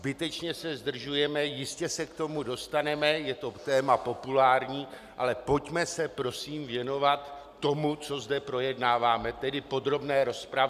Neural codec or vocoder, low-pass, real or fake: none; 14.4 kHz; real